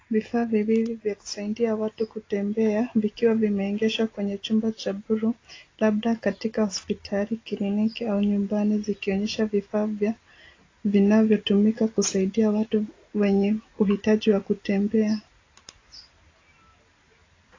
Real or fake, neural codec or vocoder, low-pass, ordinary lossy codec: real; none; 7.2 kHz; AAC, 32 kbps